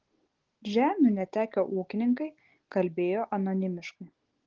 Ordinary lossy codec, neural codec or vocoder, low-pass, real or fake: Opus, 16 kbps; none; 7.2 kHz; real